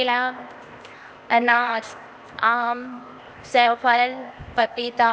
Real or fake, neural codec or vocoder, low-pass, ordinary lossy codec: fake; codec, 16 kHz, 0.8 kbps, ZipCodec; none; none